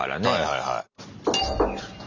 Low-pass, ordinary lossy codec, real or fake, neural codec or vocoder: 7.2 kHz; none; real; none